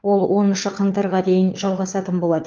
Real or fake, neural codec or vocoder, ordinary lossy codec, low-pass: fake; codec, 16 kHz, 4 kbps, FunCodec, trained on LibriTTS, 50 frames a second; Opus, 32 kbps; 7.2 kHz